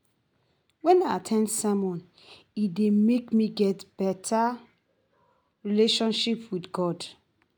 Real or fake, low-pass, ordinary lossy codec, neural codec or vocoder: real; none; none; none